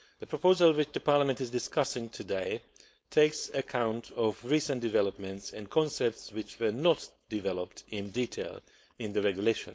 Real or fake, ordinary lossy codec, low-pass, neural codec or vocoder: fake; none; none; codec, 16 kHz, 4.8 kbps, FACodec